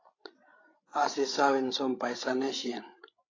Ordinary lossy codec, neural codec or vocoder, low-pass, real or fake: AAC, 32 kbps; codec, 16 kHz, 16 kbps, FreqCodec, larger model; 7.2 kHz; fake